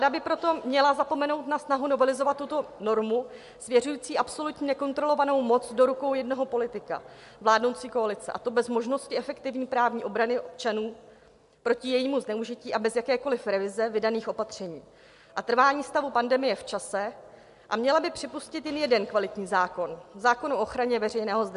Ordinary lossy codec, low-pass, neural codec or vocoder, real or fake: MP3, 64 kbps; 10.8 kHz; none; real